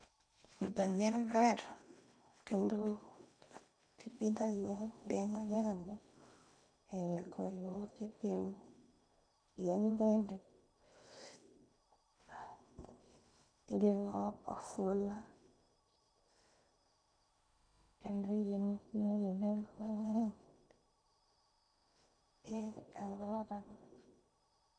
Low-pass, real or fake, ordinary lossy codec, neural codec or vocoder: 9.9 kHz; fake; none; codec, 16 kHz in and 24 kHz out, 0.8 kbps, FocalCodec, streaming, 65536 codes